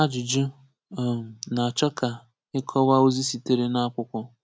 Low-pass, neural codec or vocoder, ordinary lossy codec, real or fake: none; none; none; real